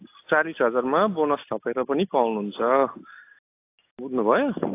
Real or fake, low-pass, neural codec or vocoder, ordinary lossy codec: real; 3.6 kHz; none; AAC, 24 kbps